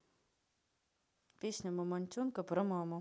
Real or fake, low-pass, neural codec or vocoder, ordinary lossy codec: real; none; none; none